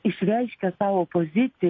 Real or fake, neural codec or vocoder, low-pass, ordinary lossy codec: real; none; 7.2 kHz; MP3, 32 kbps